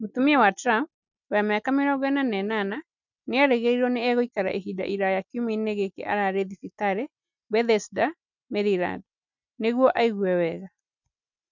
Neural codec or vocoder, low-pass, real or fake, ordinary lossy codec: none; 7.2 kHz; real; none